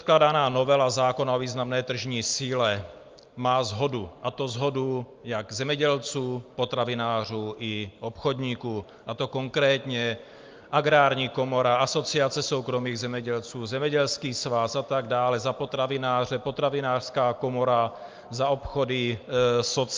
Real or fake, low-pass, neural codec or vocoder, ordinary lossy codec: real; 7.2 kHz; none; Opus, 24 kbps